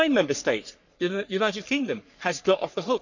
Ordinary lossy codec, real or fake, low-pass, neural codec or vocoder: none; fake; 7.2 kHz; codec, 44.1 kHz, 3.4 kbps, Pupu-Codec